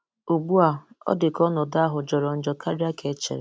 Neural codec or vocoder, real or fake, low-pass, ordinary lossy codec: none; real; none; none